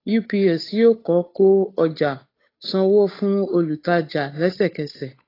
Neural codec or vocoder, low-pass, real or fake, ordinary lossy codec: codec, 16 kHz, 8 kbps, FunCodec, trained on Chinese and English, 25 frames a second; 5.4 kHz; fake; AAC, 24 kbps